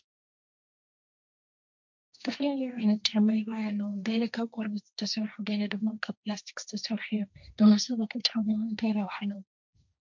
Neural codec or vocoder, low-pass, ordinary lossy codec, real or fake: codec, 16 kHz, 1.1 kbps, Voila-Tokenizer; 7.2 kHz; MP3, 64 kbps; fake